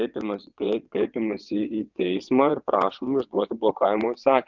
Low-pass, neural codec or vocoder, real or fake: 7.2 kHz; vocoder, 22.05 kHz, 80 mel bands, WaveNeXt; fake